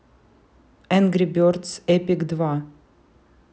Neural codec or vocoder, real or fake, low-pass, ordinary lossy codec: none; real; none; none